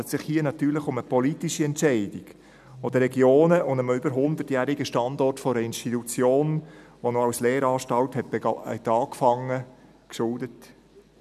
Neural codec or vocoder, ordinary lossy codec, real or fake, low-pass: none; none; real; 14.4 kHz